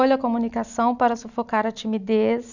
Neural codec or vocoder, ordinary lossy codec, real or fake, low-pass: none; none; real; 7.2 kHz